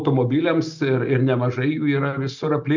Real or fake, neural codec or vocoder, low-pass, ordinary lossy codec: real; none; 7.2 kHz; MP3, 64 kbps